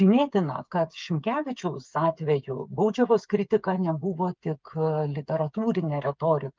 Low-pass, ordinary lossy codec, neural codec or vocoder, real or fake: 7.2 kHz; Opus, 24 kbps; codec, 16 kHz, 8 kbps, FreqCodec, smaller model; fake